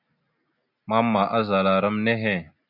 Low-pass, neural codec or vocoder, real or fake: 5.4 kHz; none; real